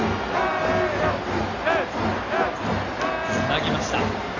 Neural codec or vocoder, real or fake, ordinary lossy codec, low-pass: none; real; AAC, 32 kbps; 7.2 kHz